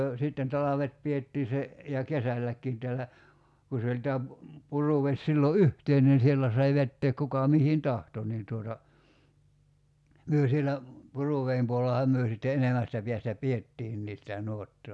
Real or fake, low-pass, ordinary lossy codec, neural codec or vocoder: real; none; none; none